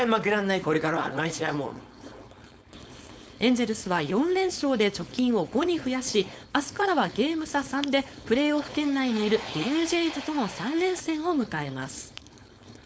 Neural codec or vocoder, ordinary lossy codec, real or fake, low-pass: codec, 16 kHz, 4.8 kbps, FACodec; none; fake; none